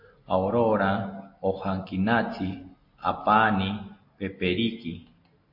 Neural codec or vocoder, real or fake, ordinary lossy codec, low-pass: none; real; MP3, 32 kbps; 5.4 kHz